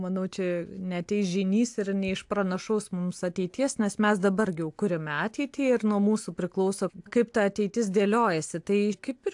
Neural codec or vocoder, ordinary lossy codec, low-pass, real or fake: none; AAC, 64 kbps; 10.8 kHz; real